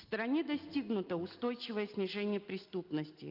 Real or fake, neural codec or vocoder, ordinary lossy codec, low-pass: real; none; Opus, 32 kbps; 5.4 kHz